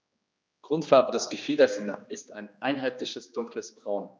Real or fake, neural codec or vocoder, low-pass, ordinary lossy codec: fake; codec, 16 kHz, 2 kbps, X-Codec, HuBERT features, trained on general audio; none; none